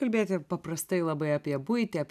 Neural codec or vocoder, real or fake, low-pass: none; real; 14.4 kHz